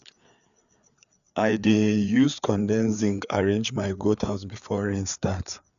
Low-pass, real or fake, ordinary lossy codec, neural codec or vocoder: 7.2 kHz; fake; none; codec, 16 kHz, 4 kbps, FreqCodec, larger model